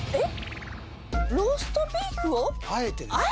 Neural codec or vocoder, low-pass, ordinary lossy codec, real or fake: none; none; none; real